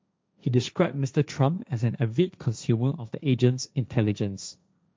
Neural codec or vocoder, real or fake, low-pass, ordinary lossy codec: codec, 16 kHz, 1.1 kbps, Voila-Tokenizer; fake; none; none